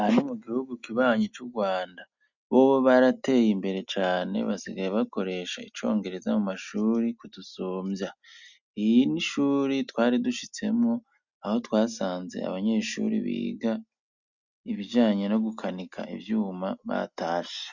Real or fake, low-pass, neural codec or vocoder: real; 7.2 kHz; none